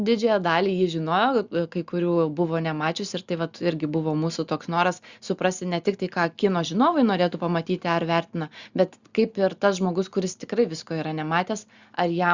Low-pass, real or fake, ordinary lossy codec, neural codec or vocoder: 7.2 kHz; real; Opus, 64 kbps; none